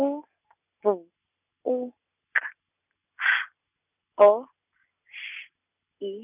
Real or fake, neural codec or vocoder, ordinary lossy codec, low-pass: real; none; none; 3.6 kHz